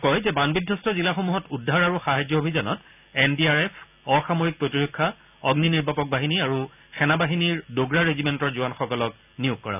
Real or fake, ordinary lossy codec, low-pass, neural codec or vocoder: real; none; 3.6 kHz; none